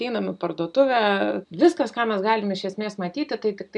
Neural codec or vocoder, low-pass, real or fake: none; 10.8 kHz; real